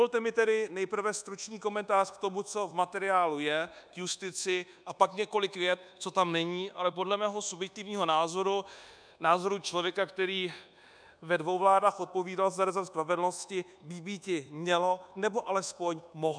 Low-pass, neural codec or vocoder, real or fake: 9.9 kHz; codec, 24 kHz, 1.2 kbps, DualCodec; fake